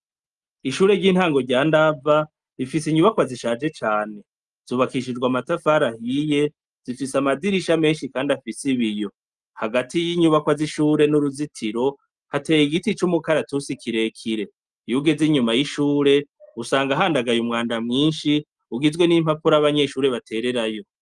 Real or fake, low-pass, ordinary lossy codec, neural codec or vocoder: real; 10.8 kHz; Opus, 32 kbps; none